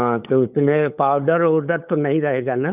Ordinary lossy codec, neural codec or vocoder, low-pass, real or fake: none; codec, 16 kHz, 8 kbps, FunCodec, trained on LibriTTS, 25 frames a second; 3.6 kHz; fake